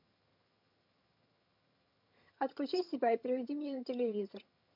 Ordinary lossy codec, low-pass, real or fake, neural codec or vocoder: MP3, 48 kbps; 5.4 kHz; fake; vocoder, 22.05 kHz, 80 mel bands, HiFi-GAN